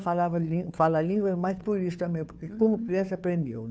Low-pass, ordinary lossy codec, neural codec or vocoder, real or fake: none; none; codec, 16 kHz, 2 kbps, FunCodec, trained on Chinese and English, 25 frames a second; fake